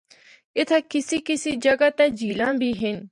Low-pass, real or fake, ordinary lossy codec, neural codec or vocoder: 10.8 kHz; fake; MP3, 96 kbps; vocoder, 44.1 kHz, 128 mel bands every 512 samples, BigVGAN v2